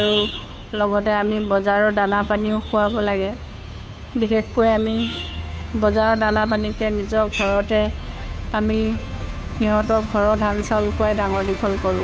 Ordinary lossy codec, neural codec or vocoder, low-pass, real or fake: none; codec, 16 kHz, 2 kbps, FunCodec, trained on Chinese and English, 25 frames a second; none; fake